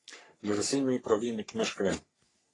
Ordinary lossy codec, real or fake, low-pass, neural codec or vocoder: AAC, 32 kbps; fake; 10.8 kHz; codec, 44.1 kHz, 3.4 kbps, Pupu-Codec